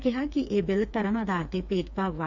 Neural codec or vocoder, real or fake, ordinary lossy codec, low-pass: codec, 16 kHz, 4 kbps, FreqCodec, smaller model; fake; none; 7.2 kHz